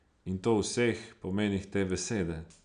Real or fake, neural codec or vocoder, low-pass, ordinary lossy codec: real; none; 9.9 kHz; none